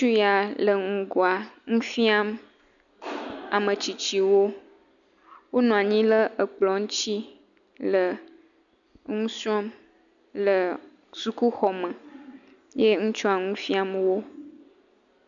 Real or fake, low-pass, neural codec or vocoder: real; 7.2 kHz; none